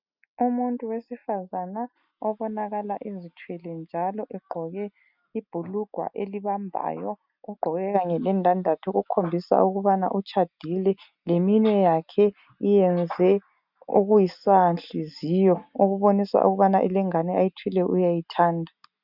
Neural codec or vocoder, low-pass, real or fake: none; 5.4 kHz; real